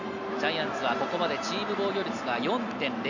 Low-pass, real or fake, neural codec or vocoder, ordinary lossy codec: 7.2 kHz; real; none; none